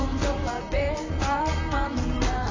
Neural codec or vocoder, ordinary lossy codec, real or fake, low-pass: vocoder, 44.1 kHz, 128 mel bands, Pupu-Vocoder; AAC, 32 kbps; fake; 7.2 kHz